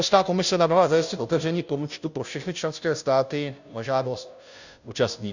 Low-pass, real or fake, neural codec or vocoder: 7.2 kHz; fake; codec, 16 kHz, 0.5 kbps, FunCodec, trained on Chinese and English, 25 frames a second